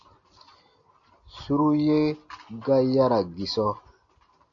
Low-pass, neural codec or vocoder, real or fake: 7.2 kHz; none; real